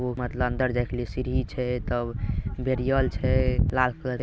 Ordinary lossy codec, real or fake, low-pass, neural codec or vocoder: none; real; none; none